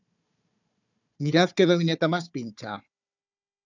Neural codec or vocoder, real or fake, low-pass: codec, 16 kHz, 4 kbps, FunCodec, trained on Chinese and English, 50 frames a second; fake; 7.2 kHz